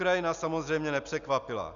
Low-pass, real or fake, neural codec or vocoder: 7.2 kHz; real; none